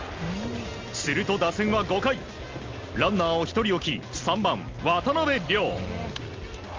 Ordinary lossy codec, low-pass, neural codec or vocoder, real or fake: Opus, 32 kbps; 7.2 kHz; none; real